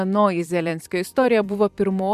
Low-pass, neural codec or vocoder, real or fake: 14.4 kHz; vocoder, 44.1 kHz, 128 mel bands every 256 samples, BigVGAN v2; fake